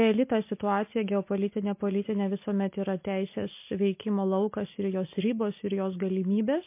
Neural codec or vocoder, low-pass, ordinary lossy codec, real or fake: none; 3.6 kHz; MP3, 24 kbps; real